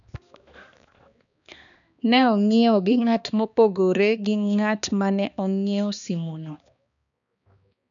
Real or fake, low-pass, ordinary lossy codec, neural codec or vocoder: fake; 7.2 kHz; none; codec, 16 kHz, 2 kbps, X-Codec, HuBERT features, trained on balanced general audio